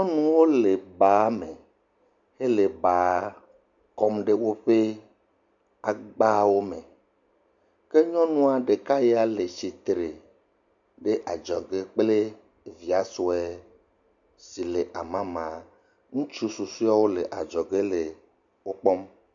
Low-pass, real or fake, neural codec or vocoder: 7.2 kHz; real; none